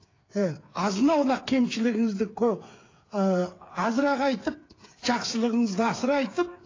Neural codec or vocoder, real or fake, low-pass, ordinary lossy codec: codec, 16 kHz, 8 kbps, FreqCodec, smaller model; fake; 7.2 kHz; AAC, 32 kbps